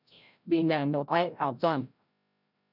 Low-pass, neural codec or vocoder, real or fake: 5.4 kHz; codec, 16 kHz, 0.5 kbps, FreqCodec, larger model; fake